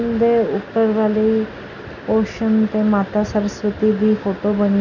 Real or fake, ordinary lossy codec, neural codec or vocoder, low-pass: real; none; none; 7.2 kHz